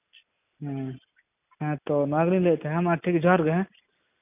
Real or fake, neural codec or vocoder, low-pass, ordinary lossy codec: real; none; 3.6 kHz; none